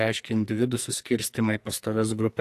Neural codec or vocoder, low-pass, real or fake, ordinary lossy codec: codec, 44.1 kHz, 2.6 kbps, SNAC; 14.4 kHz; fake; AAC, 64 kbps